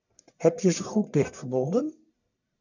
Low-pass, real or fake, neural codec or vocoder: 7.2 kHz; fake; codec, 44.1 kHz, 1.7 kbps, Pupu-Codec